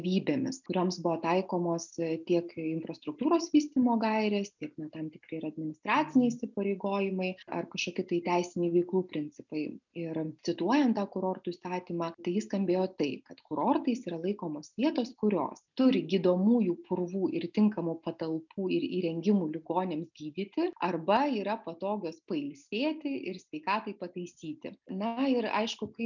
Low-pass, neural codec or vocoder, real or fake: 7.2 kHz; none; real